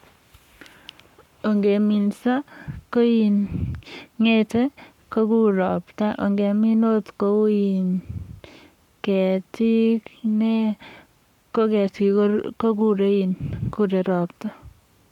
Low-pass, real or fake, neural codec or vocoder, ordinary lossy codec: 19.8 kHz; fake; codec, 44.1 kHz, 7.8 kbps, Pupu-Codec; none